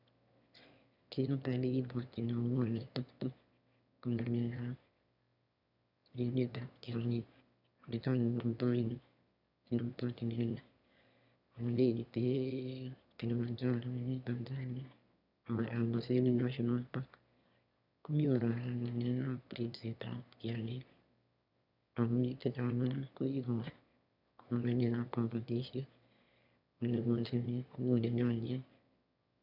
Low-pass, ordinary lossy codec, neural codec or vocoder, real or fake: 5.4 kHz; none; autoencoder, 22.05 kHz, a latent of 192 numbers a frame, VITS, trained on one speaker; fake